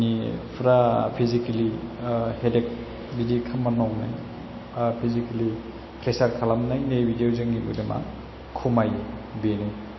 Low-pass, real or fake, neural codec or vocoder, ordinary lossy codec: 7.2 kHz; real; none; MP3, 24 kbps